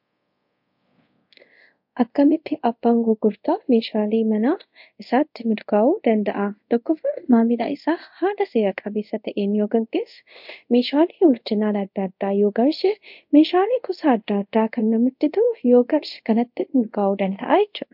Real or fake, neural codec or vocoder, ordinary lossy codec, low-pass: fake; codec, 24 kHz, 0.5 kbps, DualCodec; MP3, 48 kbps; 5.4 kHz